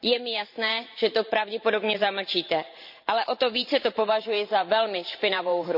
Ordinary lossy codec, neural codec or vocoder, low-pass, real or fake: none; vocoder, 44.1 kHz, 128 mel bands every 512 samples, BigVGAN v2; 5.4 kHz; fake